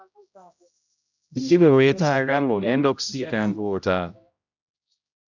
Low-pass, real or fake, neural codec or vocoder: 7.2 kHz; fake; codec, 16 kHz, 0.5 kbps, X-Codec, HuBERT features, trained on general audio